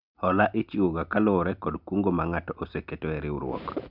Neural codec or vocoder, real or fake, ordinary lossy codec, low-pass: none; real; none; 5.4 kHz